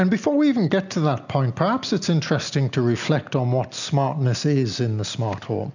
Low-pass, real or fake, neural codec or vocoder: 7.2 kHz; real; none